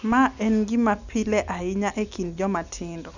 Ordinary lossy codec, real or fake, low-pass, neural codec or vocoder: none; real; 7.2 kHz; none